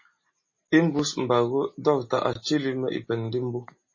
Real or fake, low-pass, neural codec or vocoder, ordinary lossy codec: real; 7.2 kHz; none; MP3, 32 kbps